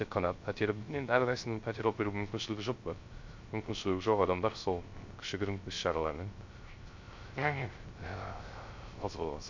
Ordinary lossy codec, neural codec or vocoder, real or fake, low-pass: MP3, 48 kbps; codec, 16 kHz, 0.3 kbps, FocalCodec; fake; 7.2 kHz